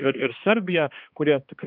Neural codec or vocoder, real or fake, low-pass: codec, 16 kHz, 2 kbps, X-Codec, HuBERT features, trained on balanced general audio; fake; 5.4 kHz